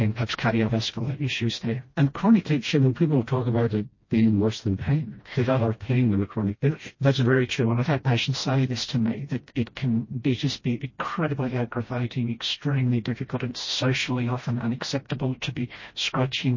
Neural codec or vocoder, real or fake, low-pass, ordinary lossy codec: codec, 16 kHz, 1 kbps, FreqCodec, smaller model; fake; 7.2 kHz; MP3, 32 kbps